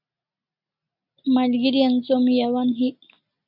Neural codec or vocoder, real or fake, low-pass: none; real; 5.4 kHz